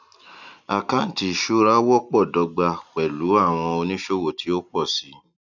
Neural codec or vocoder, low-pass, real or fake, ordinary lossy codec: none; 7.2 kHz; real; none